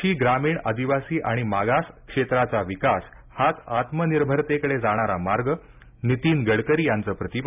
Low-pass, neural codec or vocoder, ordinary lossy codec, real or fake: 3.6 kHz; none; none; real